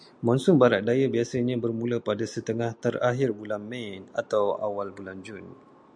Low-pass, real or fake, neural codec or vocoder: 9.9 kHz; real; none